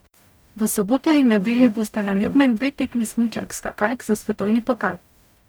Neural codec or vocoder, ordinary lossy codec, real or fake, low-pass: codec, 44.1 kHz, 0.9 kbps, DAC; none; fake; none